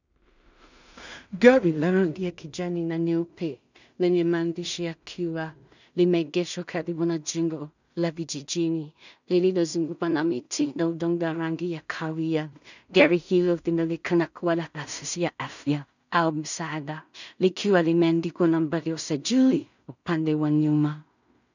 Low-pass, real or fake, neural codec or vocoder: 7.2 kHz; fake; codec, 16 kHz in and 24 kHz out, 0.4 kbps, LongCat-Audio-Codec, two codebook decoder